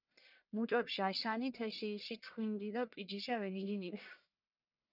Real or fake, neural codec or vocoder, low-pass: fake; codec, 44.1 kHz, 1.7 kbps, Pupu-Codec; 5.4 kHz